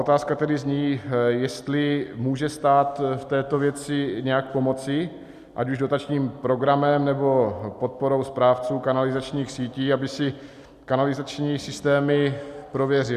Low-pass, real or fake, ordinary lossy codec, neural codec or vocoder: 14.4 kHz; real; Opus, 64 kbps; none